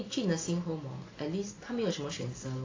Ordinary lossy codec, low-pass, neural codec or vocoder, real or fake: AAC, 32 kbps; 7.2 kHz; none; real